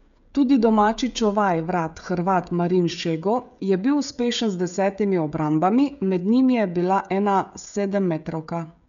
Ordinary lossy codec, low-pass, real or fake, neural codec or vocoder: none; 7.2 kHz; fake; codec, 16 kHz, 16 kbps, FreqCodec, smaller model